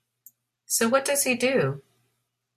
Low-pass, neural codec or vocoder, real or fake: 14.4 kHz; none; real